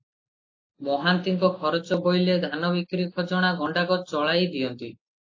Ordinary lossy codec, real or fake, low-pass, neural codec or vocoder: AAC, 48 kbps; real; 7.2 kHz; none